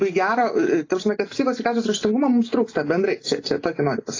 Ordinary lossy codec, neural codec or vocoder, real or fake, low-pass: AAC, 32 kbps; none; real; 7.2 kHz